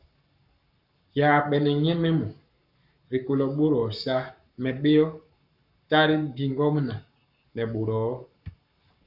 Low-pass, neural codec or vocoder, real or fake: 5.4 kHz; codec, 44.1 kHz, 7.8 kbps, Pupu-Codec; fake